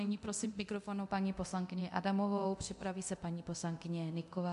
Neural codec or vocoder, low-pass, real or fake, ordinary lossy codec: codec, 24 kHz, 0.9 kbps, DualCodec; 10.8 kHz; fake; MP3, 64 kbps